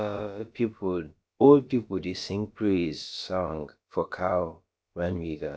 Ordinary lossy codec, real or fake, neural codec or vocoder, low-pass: none; fake; codec, 16 kHz, about 1 kbps, DyCAST, with the encoder's durations; none